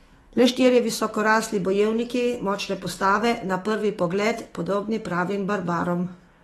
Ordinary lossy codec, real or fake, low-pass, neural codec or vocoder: AAC, 32 kbps; fake; 19.8 kHz; autoencoder, 48 kHz, 128 numbers a frame, DAC-VAE, trained on Japanese speech